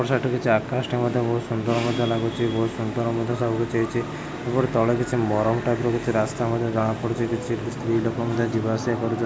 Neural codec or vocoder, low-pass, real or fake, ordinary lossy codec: none; none; real; none